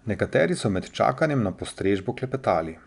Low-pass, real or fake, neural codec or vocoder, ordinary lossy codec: 10.8 kHz; real; none; none